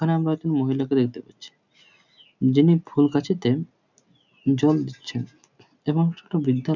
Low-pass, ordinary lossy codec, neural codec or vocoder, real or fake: 7.2 kHz; none; none; real